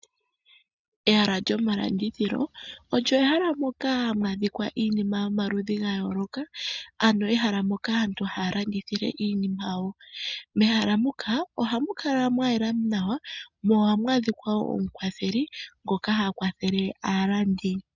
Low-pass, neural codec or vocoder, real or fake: 7.2 kHz; none; real